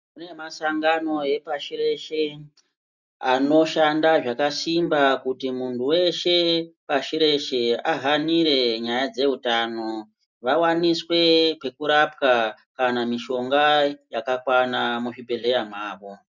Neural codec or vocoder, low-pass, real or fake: none; 7.2 kHz; real